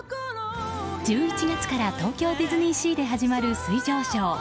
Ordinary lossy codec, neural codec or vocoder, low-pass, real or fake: none; none; none; real